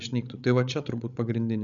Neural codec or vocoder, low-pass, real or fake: codec, 16 kHz, 16 kbps, FreqCodec, larger model; 7.2 kHz; fake